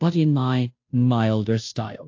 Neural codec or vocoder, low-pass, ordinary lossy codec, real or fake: codec, 16 kHz, 0.5 kbps, FunCodec, trained on Chinese and English, 25 frames a second; 7.2 kHz; AAC, 48 kbps; fake